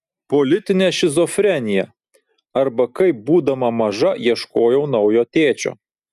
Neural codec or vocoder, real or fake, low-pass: none; real; 14.4 kHz